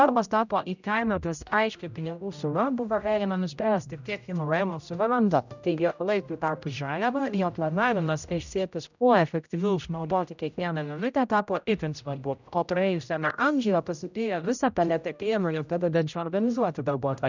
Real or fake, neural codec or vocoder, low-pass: fake; codec, 16 kHz, 0.5 kbps, X-Codec, HuBERT features, trained on general audio; 7.2 kHz